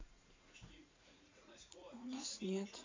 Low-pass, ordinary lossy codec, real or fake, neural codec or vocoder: 7.2 kHz; MP3, 64 kbps; fake; vocoder, 44.1 kHz, 80 mel bands, Vocos